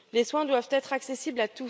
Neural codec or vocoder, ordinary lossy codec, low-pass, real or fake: none; none; none; real